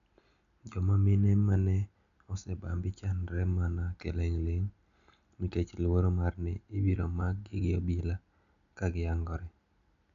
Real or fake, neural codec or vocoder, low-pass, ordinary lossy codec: real; none; 7.2 kHz; none